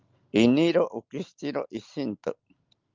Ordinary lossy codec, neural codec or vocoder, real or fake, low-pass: Opus, 24 kbps; none; real; 7.2 kHz